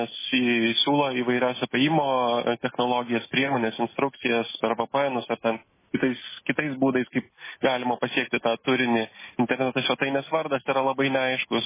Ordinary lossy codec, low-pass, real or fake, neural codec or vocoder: MP3, 16 kbps; 3.6 kHz; real; none